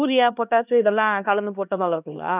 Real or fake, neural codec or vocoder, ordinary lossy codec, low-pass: fake; codec, 16 kHz, 1 kbps, X-Codec, HuBERT features, trained on LibriSpeech; none; 3.6 kHz